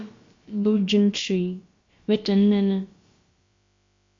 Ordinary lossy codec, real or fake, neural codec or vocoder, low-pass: MP3, 48 kbps; fake; codec, 16 kHz, about 1 kbps, DyCAST, with the encoder's durations; 7.2 kHz